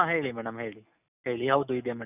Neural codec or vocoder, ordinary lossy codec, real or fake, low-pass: none; none; real; 3.6 kHz